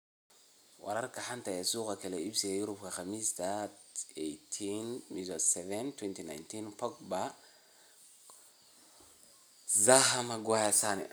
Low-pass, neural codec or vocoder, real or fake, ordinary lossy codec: none; none; real; none